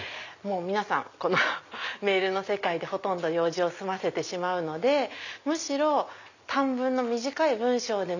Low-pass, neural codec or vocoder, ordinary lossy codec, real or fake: 7.2 kHz; none; none; real